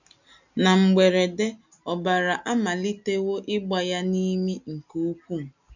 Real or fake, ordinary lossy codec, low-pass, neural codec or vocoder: real; none; 7.2 kHz; none